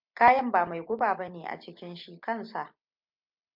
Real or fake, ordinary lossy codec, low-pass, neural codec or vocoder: real; MP3, 48 kbps; 5.4 kHz; none